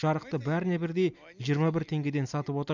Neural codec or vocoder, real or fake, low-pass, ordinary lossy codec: none; real; 7.2 kHz; none